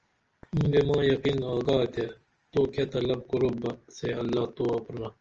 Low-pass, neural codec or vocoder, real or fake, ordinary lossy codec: 7.2 kHz; none; real; Opus, 24 kbps